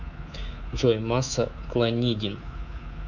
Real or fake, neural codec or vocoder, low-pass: fake; codec, 24 kHz, 3.1 kbps, DualCodec; 7.2 kHz